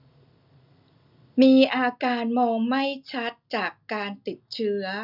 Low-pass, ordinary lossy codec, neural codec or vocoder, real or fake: 5.4 kHz; none; none; real